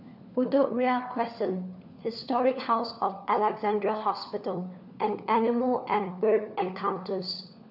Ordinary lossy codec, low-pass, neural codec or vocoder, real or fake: none; 5.4 kHz; codec, 16 kHz, 4 kbps, FunCodec, trained on LibriTTS, 50 frames a second; fake